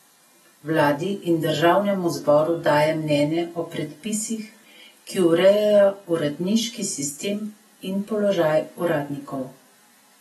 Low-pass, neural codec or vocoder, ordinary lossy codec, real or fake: 19.8 kHz; none; AAC, 32 kbps; real